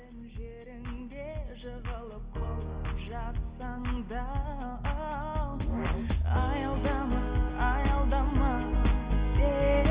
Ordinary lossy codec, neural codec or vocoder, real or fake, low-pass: Opus, 24 kbps; none; real; 3.6 kHz